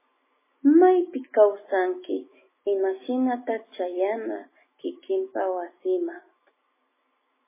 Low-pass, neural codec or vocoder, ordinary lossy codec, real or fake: 3.6 kHz; none; MP3, 16 kbps; real